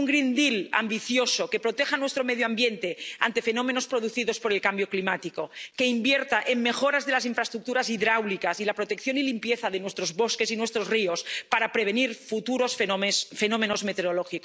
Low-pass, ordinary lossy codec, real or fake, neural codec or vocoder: none; none; real; none